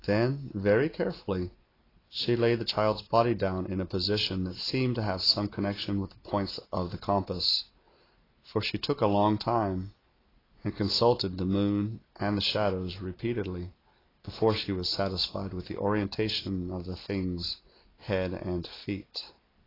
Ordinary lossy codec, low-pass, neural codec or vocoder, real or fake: AAC, 24 kbps; 5.4 kHz; none; real